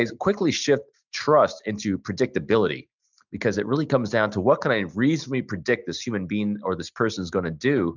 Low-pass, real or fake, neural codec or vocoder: 7.2 kHz; real; none